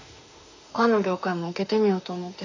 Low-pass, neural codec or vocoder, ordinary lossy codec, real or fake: 7.2 kHz; autoencoder, 48 kHz, 32 numbers a frame, DAC-VAE, trained on Japanese speech; AAC, 48 kbps; fake